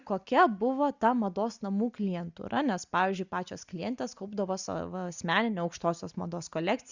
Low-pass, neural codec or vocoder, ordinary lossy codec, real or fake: 7.2 kHz; none; Opus, 64 kbps; real